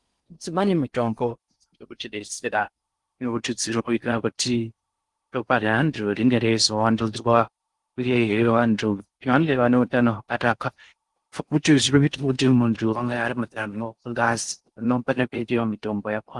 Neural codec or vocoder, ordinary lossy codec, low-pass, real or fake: codec, 16 kHz in and 24 kHz out, 0.6 kbps, FocalCodec, streaming, 4096 codes; Opus, 24 kbps; 10.8 kHz; fake